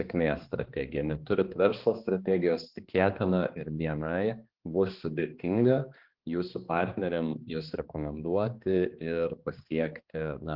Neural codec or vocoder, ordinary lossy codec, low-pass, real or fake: codec, 16 kHz, 2 kbps, X-Codec, HuBERT features, trained on balanced general audio; Opus, 16 kbps; 5.4 kHz; fake